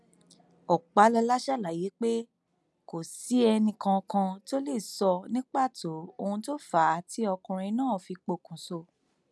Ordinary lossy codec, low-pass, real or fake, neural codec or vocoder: none; none; real; none